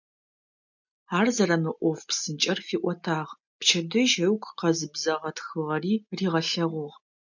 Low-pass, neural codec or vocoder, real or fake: 7.2 kHz; none; real